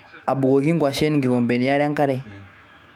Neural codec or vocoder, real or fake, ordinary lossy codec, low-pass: autoencoder, 48 kHz, 128 numbers a frame, DAC-VAE, trained on Japanese speech; fake; MP3, 96 kbps; 19.8 kHz